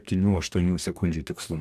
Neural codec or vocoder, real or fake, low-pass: codec, 44.1 kHz, 2.6 kbps, DAC; fake; 14.4 kHz